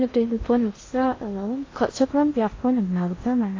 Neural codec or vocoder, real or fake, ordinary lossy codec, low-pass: codec, 16 kHz in and 24 kHz out, 0.6 kbps, FocalCodec, streaming, 2048 codes; fake; AAC, 32 kbps; 7.2 kHz